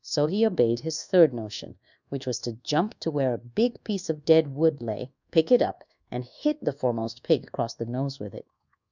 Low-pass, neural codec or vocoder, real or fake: 7.2 kHz; codec, 24 kHz, 1.2 kbps, DualCodec; fake